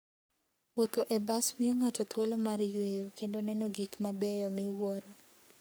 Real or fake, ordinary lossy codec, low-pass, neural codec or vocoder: fake; none; none; codec, 44.1 kHz, 3.4 kbps, Pupu-Codec